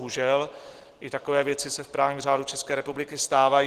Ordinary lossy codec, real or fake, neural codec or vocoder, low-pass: Opus, 16 kbps; real; none; 14.4 kHz